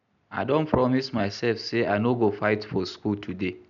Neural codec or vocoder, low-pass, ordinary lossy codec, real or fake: none; 7.2 kHz; none; real